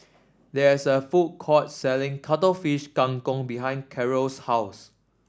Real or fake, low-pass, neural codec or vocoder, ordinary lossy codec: real; none; none; none